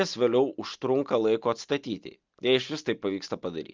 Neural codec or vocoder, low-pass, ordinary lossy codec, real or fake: none; 7.2 kHz; Opus, 24 kbps; real